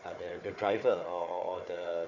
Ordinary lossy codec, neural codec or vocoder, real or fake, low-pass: none; codec, 16 kHz, 4 kbps, FunCodec, trained on Chinese and English, 50 frames a second; fake; 7.2 kHz